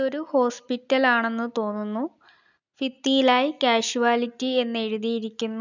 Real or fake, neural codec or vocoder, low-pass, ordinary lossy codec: real; none; 7.2 kHz; none